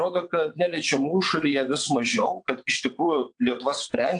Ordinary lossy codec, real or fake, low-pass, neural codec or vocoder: AAC, 64 kbps; fake; 9.9 kHz; vocoder, 22.05 kHz, 80 mel bands, WaveNeXt